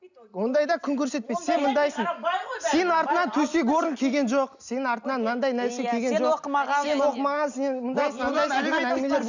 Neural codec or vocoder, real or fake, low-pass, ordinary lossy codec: none; real; 7.2 kHz; none